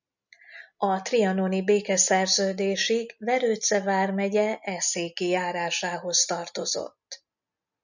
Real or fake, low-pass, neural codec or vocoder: real; 7.2 kHz; none